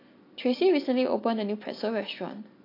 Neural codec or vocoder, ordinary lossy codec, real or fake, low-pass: none; AAC, 32 kbps; real; 5.4 kHz